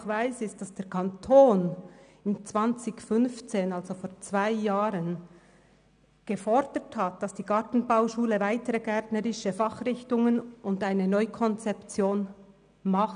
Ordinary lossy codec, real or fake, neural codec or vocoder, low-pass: none; real; none; 9.9 kHz